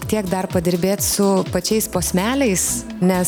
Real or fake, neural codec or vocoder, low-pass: real; none; 19.8 kHz